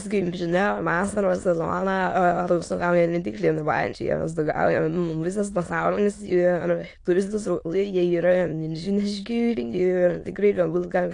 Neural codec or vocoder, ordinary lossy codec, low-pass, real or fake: autoencoder, 22.05 kHz, a latent of 192 numbers a frame, VITS, trained on many speakers; AAC, 48 kbps; 9.9 kHz; fake